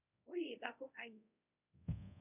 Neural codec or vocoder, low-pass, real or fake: codec, 24 kHz, 0.5 kbps, DualCodec; 3.6 kHz; fake